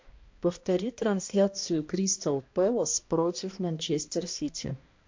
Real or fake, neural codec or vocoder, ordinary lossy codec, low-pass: fake; codec, 16 kHz, 1 kbps, X-Codec, HuBERT features, trained on balanced general audio; MP3, 48 kbps; 7.2 kHz